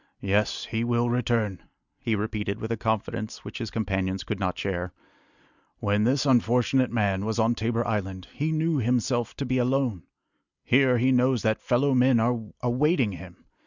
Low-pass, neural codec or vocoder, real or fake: 7.2 kHz; none; real